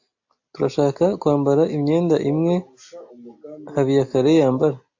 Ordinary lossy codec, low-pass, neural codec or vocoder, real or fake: AAC, 48 kbps; 7.2 kHz; none; real